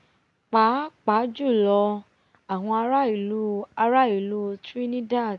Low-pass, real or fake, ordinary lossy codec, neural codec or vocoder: 10.8 kHz; real; none; none